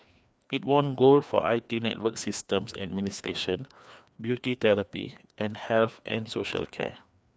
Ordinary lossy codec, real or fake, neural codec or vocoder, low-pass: none; fake; codec, 16 kHz, 2 kbps, FreqCodec, larger model; none